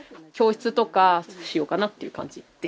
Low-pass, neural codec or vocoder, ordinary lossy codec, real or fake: none; none; none; real